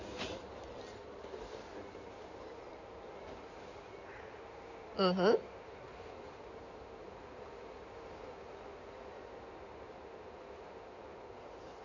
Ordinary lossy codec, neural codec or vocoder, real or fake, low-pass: none; codec, 16 kHz in and 24 kHz out, 2.2 kbps, FireRedTTS-2 codec; fake; 7.2 kHz